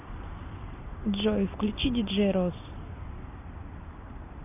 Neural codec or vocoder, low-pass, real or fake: none; 3.6 kHz; real